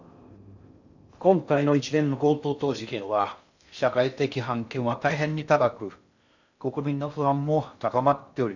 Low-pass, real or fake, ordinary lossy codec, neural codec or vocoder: 7.2 kHz; fake; none; codec, 16 kHz in and 24 kHz out, 0.6 kbps, FocalCodec, streaming, 2048 codes